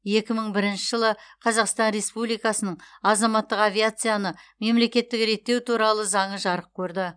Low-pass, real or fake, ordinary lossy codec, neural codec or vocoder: 9.9 kHz; real; none; none